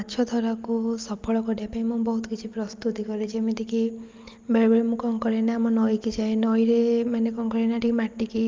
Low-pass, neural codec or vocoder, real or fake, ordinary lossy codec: 7.2 kHz; none; real; Opus, 32 kbps